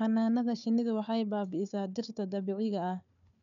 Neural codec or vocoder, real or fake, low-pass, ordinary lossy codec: codec, 16 kHz, 4 kbps, FunCodec, trained on Chinese and English, 50 frames a second; fake; 7.2 kHz; none